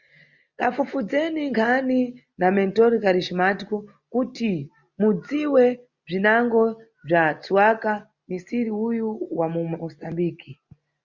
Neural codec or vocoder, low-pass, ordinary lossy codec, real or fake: none; 7.2 kHz; Opus, 64 kbps; real